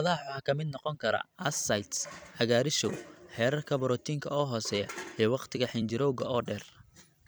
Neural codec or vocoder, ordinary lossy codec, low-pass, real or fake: vocoder, 44.1 kHz, 128 mel bands every 256 samples, BigVGAN v2; none; none; fake